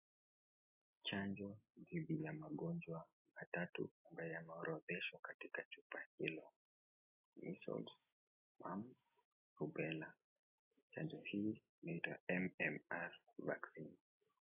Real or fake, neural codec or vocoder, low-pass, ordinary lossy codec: real; none; 3.6 kHz; AAC, 32 kbps